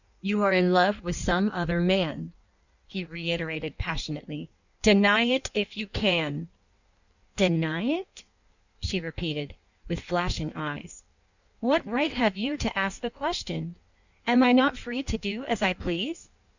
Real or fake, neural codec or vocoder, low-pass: fake; codec, 16 kHz in and 24 kHz out, 1.1 kbps, FireRedTTS-2 codec; 7.2 kHz